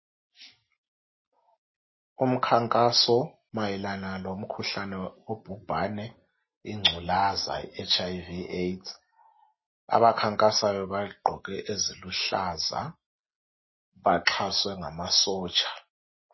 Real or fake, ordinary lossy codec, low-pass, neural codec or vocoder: real; MP3, 24 kbps; 7.2 kHz; none